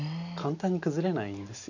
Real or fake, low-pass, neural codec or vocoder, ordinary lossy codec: real; 7.2 kHz; none; none